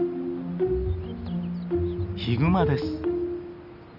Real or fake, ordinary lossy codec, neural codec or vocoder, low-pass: real; none; none; 5.4 kHz